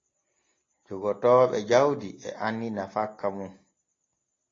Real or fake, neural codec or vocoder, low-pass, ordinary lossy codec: real; none; 7.2 kHz; AAC, 32 kbps